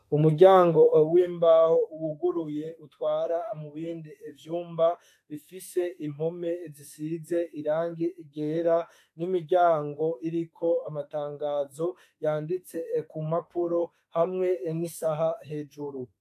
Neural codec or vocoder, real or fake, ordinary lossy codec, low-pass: autoencoder, 48 kHz, 32 numbers a frame, DAC-VAE, trained on Japanese speech; fake; AAC, 64 kbps; 14.4 kHz